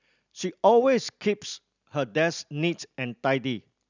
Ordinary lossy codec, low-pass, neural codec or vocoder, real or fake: none; 7.2 kHz; none; real